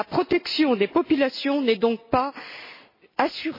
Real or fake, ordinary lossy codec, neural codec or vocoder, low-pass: real; MP3, 24 kbps; none; 5.4 kHz